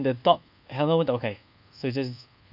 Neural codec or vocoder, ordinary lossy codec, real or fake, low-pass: codec, 24 kHz, 1.2 kbps, DualCodec; none; fake; 5.4 kHz